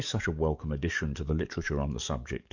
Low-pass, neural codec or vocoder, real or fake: 7.2 kHz; codec, 44.1 kHz, 7.8 kbps, DAC; fake